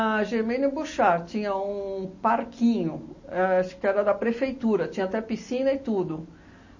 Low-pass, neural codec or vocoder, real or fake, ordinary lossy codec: 7.2 kHz; none; real; MP3, 32 kbps